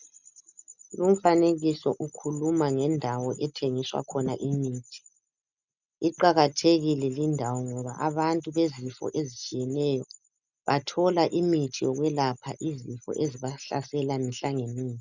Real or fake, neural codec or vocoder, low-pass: real; none; 7.2 kHz